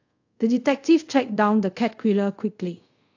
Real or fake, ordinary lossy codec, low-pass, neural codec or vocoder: fake; AAC, 48 kbps; 7.2 kHz; codec, 24 kHz, 0.5 kbps, DualCodec